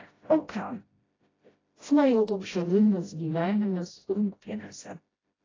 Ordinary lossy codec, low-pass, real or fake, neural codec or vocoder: AAC, 32 kbps; 7.2 kHz; fake; codec, 16 kHz, 0.5 kbps, FreqCodec, smaller model